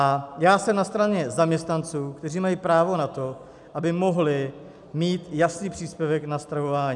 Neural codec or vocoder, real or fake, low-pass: none; real; 10.8 kHz